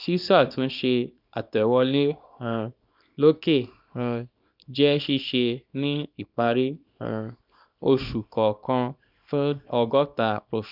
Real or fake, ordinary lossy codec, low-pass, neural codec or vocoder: fake; none; 5.4 kHz; codec, 24 kHz, 0.9 kbps, WavTokenizer, small release